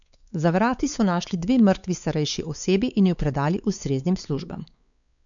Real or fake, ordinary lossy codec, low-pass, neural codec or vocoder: fake; none; 7.2 kHz; codec, 16 kHz, 4 kbps, X-Codec, WavLM features, trained on Multilingual LibriSpeech